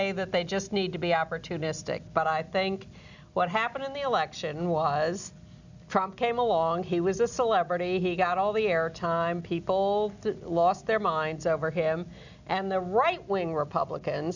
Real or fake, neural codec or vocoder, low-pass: real; none; 7.2 kHz